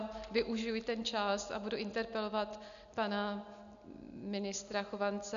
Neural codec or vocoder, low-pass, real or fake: none; 7.2 kHz; real